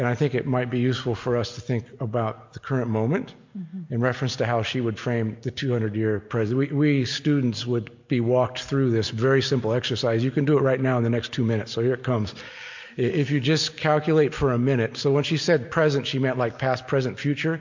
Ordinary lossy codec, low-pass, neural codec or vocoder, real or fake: MP3, 48 kbps; 7.2 kHz; none; real